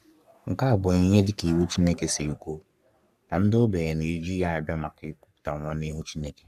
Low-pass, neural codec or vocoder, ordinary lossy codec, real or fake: 14.4 kHz; codec, 44.1 kHz, 3.4 kbps, Pupu-Codec; none; fake